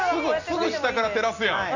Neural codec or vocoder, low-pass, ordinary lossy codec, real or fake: none; 7.2 kHz; none; real